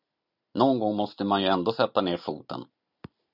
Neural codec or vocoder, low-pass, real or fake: none; 5.4 kHz; real